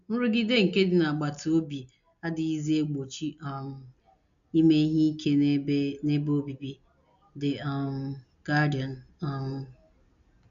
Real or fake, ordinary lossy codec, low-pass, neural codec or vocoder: real; none; 7.2 kHz; none